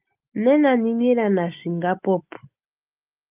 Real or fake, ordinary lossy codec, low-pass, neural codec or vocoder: real; Opus, 24 kbps; 3.6 kHz; none